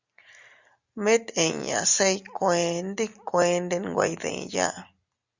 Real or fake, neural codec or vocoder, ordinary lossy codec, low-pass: real; none; Opus, 64 kbps; 7.2 kHz